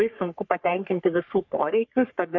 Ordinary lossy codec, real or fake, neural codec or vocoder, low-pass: MP3, 48 kbps; fake; codec, 44.1 kHz, 3.4 kbps, Pupu-Codec; 7.2 kHz